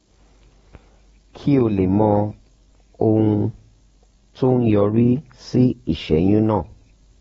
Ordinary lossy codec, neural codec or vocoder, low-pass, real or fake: AAC, 24 kbps; codec, 44.1 kHz, 7.8 kbps, DAC; 19.8 kHz; fake